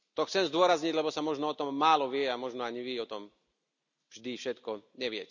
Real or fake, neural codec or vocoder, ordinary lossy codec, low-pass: real; none; none; 7.2 kHz